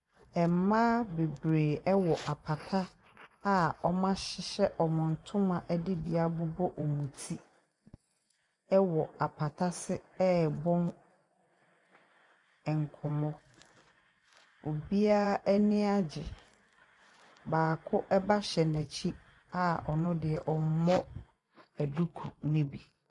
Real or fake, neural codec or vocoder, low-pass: fake; vocoder, 24 kHz, 100 mel bands, Vocos; 10.8 kHz